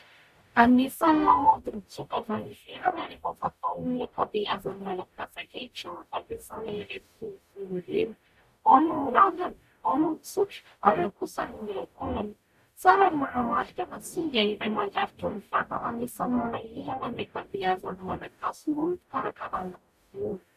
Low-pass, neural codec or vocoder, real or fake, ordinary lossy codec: 14.4 kHz; codec, 44.1 kHz, 0.9 kbps, DAC; fake; MP3, 96 kbps